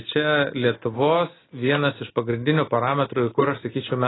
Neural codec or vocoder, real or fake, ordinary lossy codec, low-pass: none; real; AAC, 16 kbps; 7.2 kHz